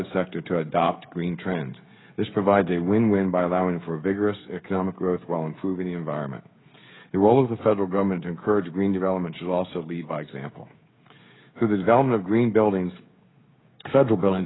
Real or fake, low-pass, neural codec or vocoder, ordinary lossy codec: fake; 7.2 kHz; codec, 16 kHz, 16 kbps, FreqCodec, smaller model; AAC, 16 kbps